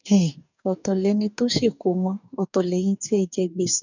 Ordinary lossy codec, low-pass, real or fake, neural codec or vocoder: none; 7.2 kHz; fake; codec, 44.1 kHz, 2.6 kbps, DAC